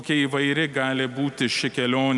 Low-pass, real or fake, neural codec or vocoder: 10.8 kHz; real; none